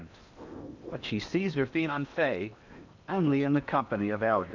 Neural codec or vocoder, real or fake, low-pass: codec, 16 kHz in and 24 kHz out, 0.8 kbps, FocalCodec, streaming, 65536 codes; fake; 7.2 kHz